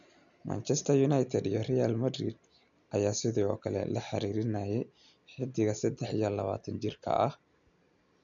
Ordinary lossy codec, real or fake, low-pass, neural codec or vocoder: none; real; 7.2 kHz; none